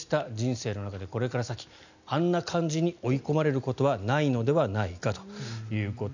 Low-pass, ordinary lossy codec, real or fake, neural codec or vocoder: 7.2 kHz; none; real; none